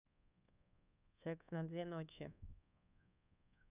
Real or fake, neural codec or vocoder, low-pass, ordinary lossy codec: fake; codec, 24 kHz, 1.2 kbps, DualCodec; 3.6 kHz; none